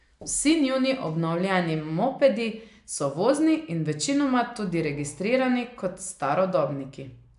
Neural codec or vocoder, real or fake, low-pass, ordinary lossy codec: none; real; 10.8 kHz; AAC, 96 kbps